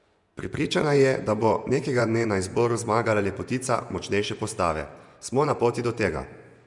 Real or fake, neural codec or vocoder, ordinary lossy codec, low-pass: fake; vocoder, 24 kHz, 100 mel bands, Vocos; none; 10.8 kHz